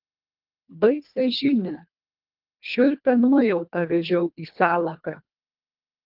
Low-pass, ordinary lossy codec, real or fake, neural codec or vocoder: 5.4 kHz; Opus, 24 kbps; fake; codec, 24 kHz, 1.5 kbps, HILCodec